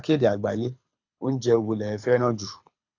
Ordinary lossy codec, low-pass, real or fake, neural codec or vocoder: AAC, 48 kbps; 7.2 kHz; fake; codec, 24 kHz, 6 kbps, HILCodec